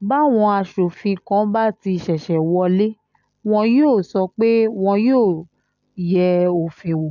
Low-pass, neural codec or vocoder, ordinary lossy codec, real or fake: 7.2 kHz; none; none; real